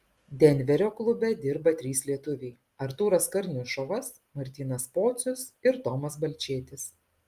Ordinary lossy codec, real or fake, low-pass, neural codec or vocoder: Opus, 32 kbps; real; 14.4 kHz; none